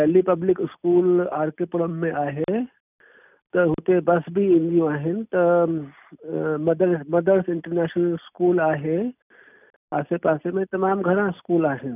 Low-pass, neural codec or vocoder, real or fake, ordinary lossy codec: 3.6 kHz; none; real; none